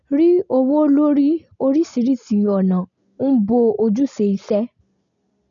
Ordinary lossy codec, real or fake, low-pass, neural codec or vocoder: MP3, 96 kbps; real; 7.2 kHz; none